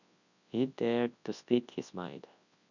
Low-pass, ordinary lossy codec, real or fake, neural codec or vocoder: 7.2 kHz; none; fake; codec, 24 kHz, 0.9 kbps, WavTokenizer, large speech release